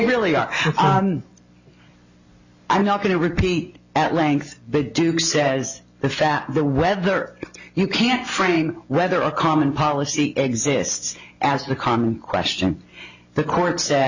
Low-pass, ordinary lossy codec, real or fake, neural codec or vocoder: 7.2 kHz; Opus, 64 kbps; real; none